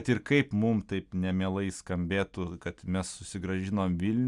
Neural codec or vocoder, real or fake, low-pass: none; real; 10.8 kHz